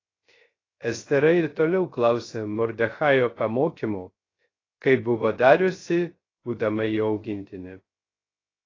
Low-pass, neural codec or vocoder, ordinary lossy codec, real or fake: 7.2 kHz; codec, 16 kHz, 0.3 kbps, FocalCodec; AAC, 32 kbps; fake